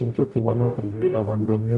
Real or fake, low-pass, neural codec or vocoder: fake; 10.8 kHz; codec, 44.1 kHz, 0.9 kbps, DAC